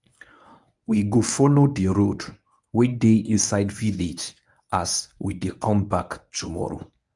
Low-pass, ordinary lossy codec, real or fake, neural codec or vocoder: 10.8 kHz; none; fake; codec, 24 kHz, 0.9 kbps, WavTokenizer, medium speech release version 1